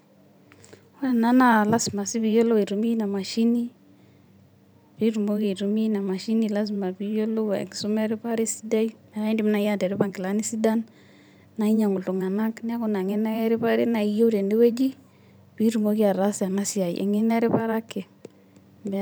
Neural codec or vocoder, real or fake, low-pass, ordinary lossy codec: vocoder, 44.1 kHz, 128 mel bands every 512 samples, BigVGAN v2; fake; none; none